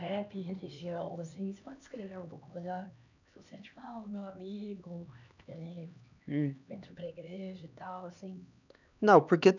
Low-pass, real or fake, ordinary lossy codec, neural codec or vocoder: 7.2 kHz; fake; none; codec, 16 kHz, 2 kbps, X-Codec, HuBERT features, trained on LibriSpeech